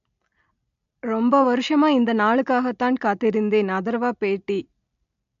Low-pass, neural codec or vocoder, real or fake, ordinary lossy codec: 7.2 kHz; none; real; AAC, 64 kbps